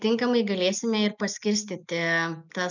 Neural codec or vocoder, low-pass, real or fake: none; 7.2 kHz; real